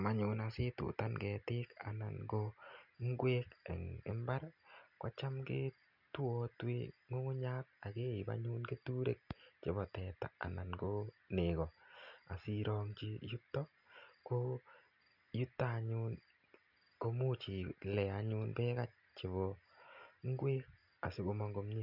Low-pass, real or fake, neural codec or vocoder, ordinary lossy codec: 5.4 kHz; real; none; none